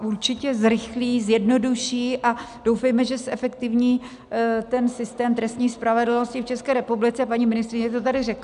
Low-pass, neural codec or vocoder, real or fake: 10.8 kHz; none; real